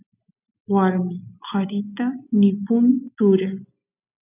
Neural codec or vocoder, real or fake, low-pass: none; real; 3.6 kHz